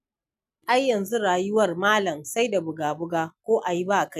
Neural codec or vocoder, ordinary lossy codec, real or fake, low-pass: none; none; real; 14.4 kHz